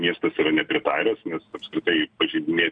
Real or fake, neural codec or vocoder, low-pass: real; none; 9.9 kHz